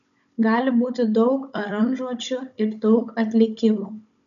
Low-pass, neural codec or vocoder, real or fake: 7.2 kHz; codec, 16 kHz, 16 kbps, FunCodec, trained on Chinese and English, 50 frames a second; fake